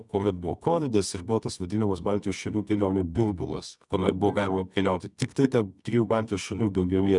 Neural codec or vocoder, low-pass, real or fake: codec, 24 kHz, 0.9 kbps, WavTokenizer, medium music audio release; 10.8 kHz; fake